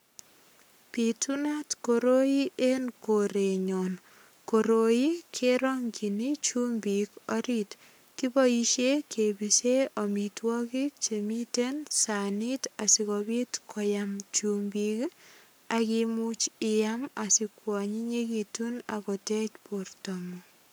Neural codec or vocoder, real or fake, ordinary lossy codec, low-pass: codec, 44.1 kHz, 7.8 kbps, Pupu-Codec; fake; none; none